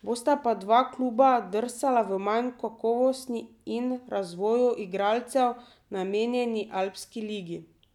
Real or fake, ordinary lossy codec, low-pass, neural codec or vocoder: real; none; 19.8 kHz; none